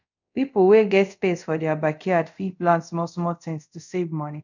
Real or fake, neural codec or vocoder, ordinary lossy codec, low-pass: fake; codec, 24 kHz, 0.5 kbps, DualCodec; none; 7.2 kHz